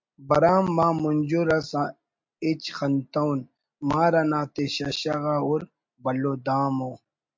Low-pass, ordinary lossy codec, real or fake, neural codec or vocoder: 7.2 kHz; MP3, 48 kbps; real; none